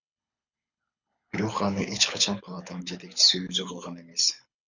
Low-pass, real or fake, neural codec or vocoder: 7.2 kHz; fake; codec, 24 kHz, 6 kbps, HILCodec